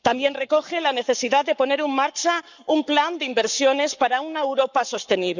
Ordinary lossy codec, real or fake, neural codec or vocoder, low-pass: none; fake; codec, 44.1 kHz, 7.8 kbps, Pupu-Codec; 7.2 kHz